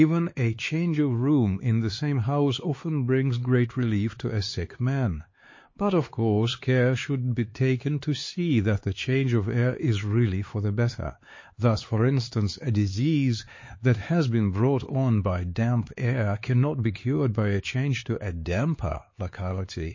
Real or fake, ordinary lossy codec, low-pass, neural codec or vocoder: fake; MP3, 32 kbps; 7.2 kHz; codec, 16 kHz, 4 kbps, X-Codec, HuBERT features, trained on LibriSpeech